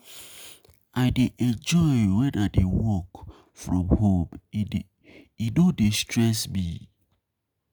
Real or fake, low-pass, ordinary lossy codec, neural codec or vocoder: real; none; none; none